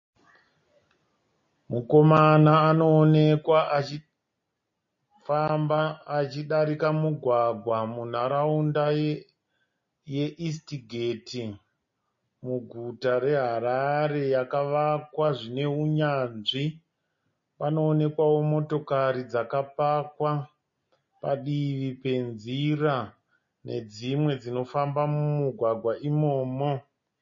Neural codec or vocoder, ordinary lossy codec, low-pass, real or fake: none; MP3, 32 kbps; 7.2 kHz; real